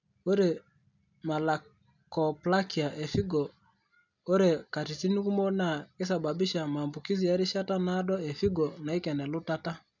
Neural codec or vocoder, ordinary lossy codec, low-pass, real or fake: none; none; 7.2 kHz; real